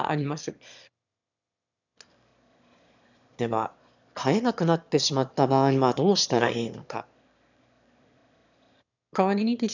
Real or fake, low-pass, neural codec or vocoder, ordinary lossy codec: fake; 7.2 kHz; autoencoder, 22.05 kHz, a latent of 192 numbers a frame, VITS, trained on one speaker; none